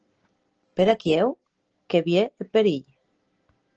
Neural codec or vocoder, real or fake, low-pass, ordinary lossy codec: none; real; 7.2 kHz; Opus, 16 kbps